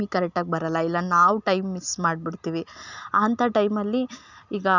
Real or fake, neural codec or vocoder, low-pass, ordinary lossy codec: real; none; 7.2 kHz; none